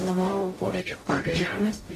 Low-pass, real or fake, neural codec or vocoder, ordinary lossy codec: 14.4 kHz; fake; codec, 44.1 kHz, 0.9 kbps, DAC; AAC, 48 kbps